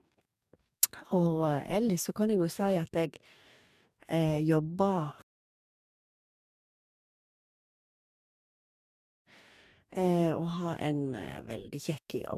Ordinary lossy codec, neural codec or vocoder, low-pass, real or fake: none; codec, 44.1 kHz, 2.6 kbps, DAC; 14.4 kHz; fake